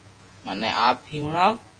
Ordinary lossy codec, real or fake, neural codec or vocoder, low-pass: Opus, 24 kbps; fake; vocoder, 48 kHz, 128 mel bands, Vocos; 9.9 kHz